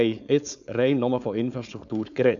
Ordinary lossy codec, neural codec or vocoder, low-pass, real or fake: none; codec, 16 kHz, 4.8 kbps, FACodec; 7.2 kHz; fake